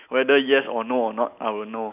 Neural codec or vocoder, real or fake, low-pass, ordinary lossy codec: none; real; 3.6 kHz; none